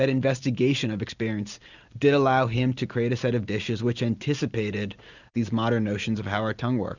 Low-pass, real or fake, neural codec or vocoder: 7.2 kHz; real; none